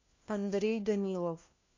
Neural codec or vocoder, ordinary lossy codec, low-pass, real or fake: codec, 16 kHz, 1 kbps, FunCodec, trained on LibriTTS, 50 frames a second; MP3, 48 kbps; 7.2 kHz; fake